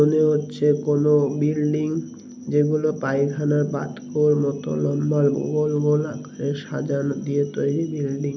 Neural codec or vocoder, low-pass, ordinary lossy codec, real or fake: none; none; none; real